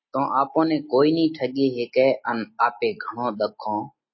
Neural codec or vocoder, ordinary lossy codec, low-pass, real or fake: none; MP3, 24 kbps; 7.2 kHz; real